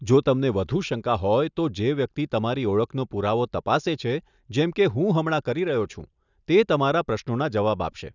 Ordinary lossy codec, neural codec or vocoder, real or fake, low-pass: none; none; real; 7.2 kHz